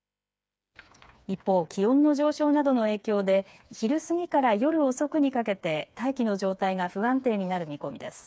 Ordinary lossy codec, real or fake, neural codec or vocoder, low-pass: none; fake; codec, 16 kHz, 4 kbps, FreqCodec, smaller model; none